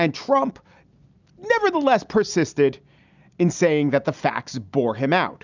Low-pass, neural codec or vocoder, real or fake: 7.2 kHz; none; real